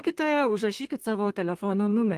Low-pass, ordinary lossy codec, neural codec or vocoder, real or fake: 14.4 kHz; Opus, 24 kbps; codec, 32 kHz, 1.9 kbps, SNAC; fake